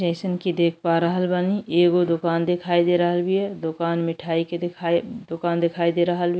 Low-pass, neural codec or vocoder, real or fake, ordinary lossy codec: none; none; real; none